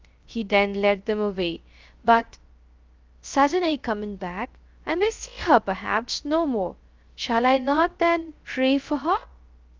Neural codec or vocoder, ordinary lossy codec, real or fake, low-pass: codec, 16 kHz, 0.3 kbps, FocalCodec; Opus, 24 kbps; fake; 7.2 kHz